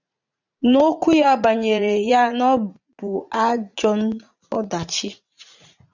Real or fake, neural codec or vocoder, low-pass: fake; vocoder, 44.1 kHz, 80 mel bands, Vocos; 7.2 kHz